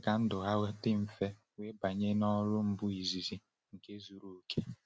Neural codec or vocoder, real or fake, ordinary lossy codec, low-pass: none; real; none; none